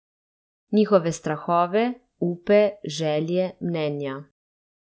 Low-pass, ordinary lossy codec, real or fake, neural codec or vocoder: none; none; real; none